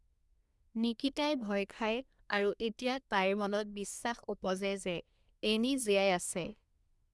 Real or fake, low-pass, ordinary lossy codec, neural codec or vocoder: fake; none; none; codec, 24 kHz, 1 kbps, SNAC